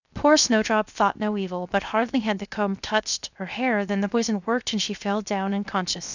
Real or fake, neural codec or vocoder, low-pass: fake; codec, 16 kHz, 0.7 kbps, FocalCodec; 7.2 kHz